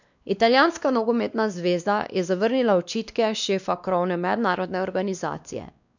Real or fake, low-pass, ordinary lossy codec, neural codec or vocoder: fake; 7.2 kHz; none; codec, 16 kHz, 2 kbps, X-Codec, WavLM features, trained on Multilingual LibriSpeech